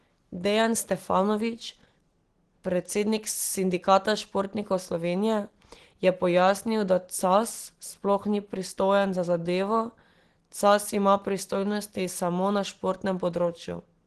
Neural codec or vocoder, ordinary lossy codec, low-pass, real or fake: none; Opus, 16 kbps; 10.8 kHz; real